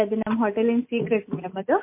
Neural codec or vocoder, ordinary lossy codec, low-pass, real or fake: none; MP3, 24 kbps; 3.6 kHz; real